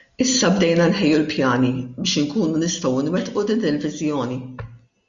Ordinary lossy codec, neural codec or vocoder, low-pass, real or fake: Opus, 64 kbps; none; 7.2 kHz; real